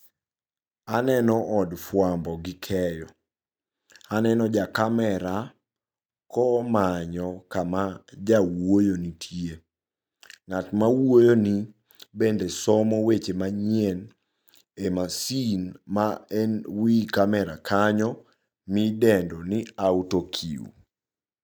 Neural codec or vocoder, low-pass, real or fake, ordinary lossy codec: none; none; real; none